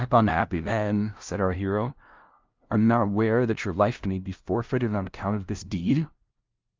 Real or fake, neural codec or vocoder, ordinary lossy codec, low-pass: fake; codec, 16 kHz, 0.5 kbps, FunCodec, trained on LibriTTS, 25 frames a second; Opus, 24 kbps; 7.2 kHz